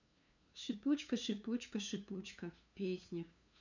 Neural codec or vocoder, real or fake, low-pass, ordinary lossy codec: codec, 16 kHz, 2 kbps, FunCodec, trained on LibriTTS, 25 frames a second; fake; 7.2 kHz; none